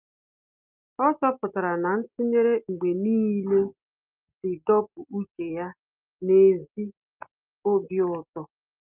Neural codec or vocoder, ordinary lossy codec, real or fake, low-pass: none; Opus, 24 kbps; real; 3.6 kHz